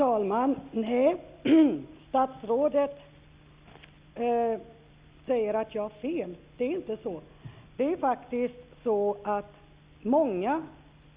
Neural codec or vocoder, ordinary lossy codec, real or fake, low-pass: none; Opus, 64 kbps; real; 3.6 kHz